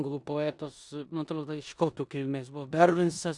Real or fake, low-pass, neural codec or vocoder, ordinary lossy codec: fake; 10.8 kHz; codec, 16 kHz in and 24 kHz out, 0.9 kbps, LongCat-Audio-Codec, four codebook decoder; Opus, 64 kbps